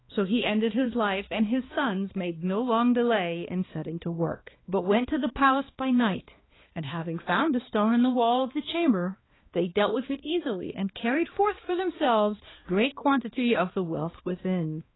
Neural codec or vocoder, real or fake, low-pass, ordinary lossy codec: codec, 16 kHz, 2 kbps, X-Codec, HuBERT features, trained on balanced general audio; fake; 7.2 kHz; AAC, 16 kbps